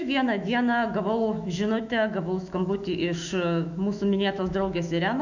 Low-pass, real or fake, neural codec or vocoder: 7.2 kHz; fake; autoencoder, 48 kHz, 128 numbers a frame, DAC-VAE, trained on Japanese speech